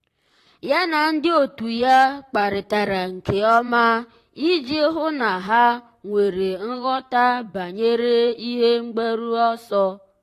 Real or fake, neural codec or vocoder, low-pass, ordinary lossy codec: fake; vocoder, 44.1 kHz, 128 mel bands, Pupu-Vocoder; 14.4 kHz; AAC, 48 kbps